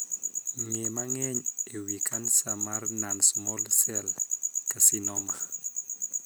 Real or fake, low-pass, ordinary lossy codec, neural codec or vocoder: real; none; none; none